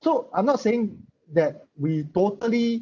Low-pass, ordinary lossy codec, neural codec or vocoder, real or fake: 7.2 kHz; none; none; real